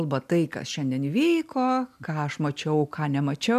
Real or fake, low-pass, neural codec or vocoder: real; 14.4 kHz; none